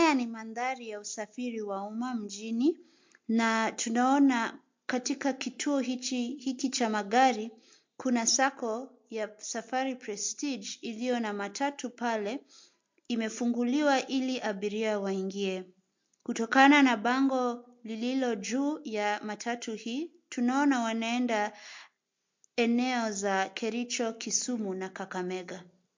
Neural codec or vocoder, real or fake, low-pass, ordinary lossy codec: none; real; 7.2 kHz; MP3, 48 kbps